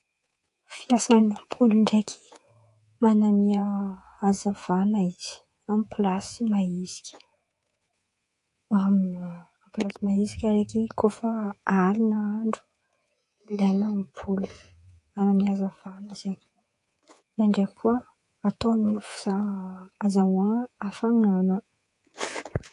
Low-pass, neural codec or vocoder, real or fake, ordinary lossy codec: 10.8 kHz; codec, 24 kHz, 3.1 kbps, DualCodec; fake; AAC, 48 kbps